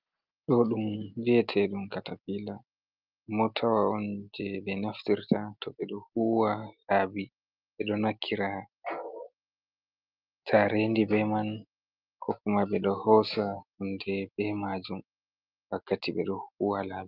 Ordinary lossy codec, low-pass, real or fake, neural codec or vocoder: Opus, 32 kbps; 5.4 kHz; real; none